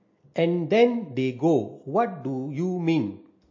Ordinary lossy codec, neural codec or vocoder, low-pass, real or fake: MP3, 32 kbps; none; 7.2 kHz; real